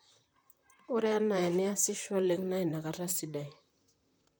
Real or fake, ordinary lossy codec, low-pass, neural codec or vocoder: fake; none; none; vocoder, 44.1 kHz, 128 mel bands, Pupu-Vocoder